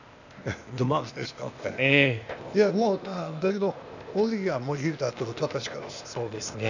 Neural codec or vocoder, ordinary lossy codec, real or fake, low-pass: codec, 16 kHz, 0.8 kbps, ZipCodec; none; fake; 7.2 kHz